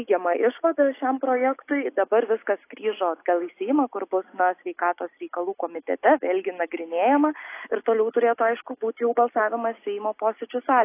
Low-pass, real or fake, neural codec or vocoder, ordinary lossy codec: 3.6 kHz; real; none; AAC, 24 kbps